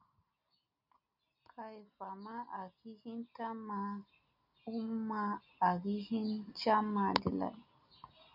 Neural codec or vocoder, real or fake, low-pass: none; real; 5.4 kHz